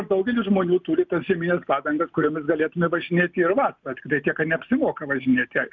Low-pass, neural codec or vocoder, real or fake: 7.2 kHz; none; real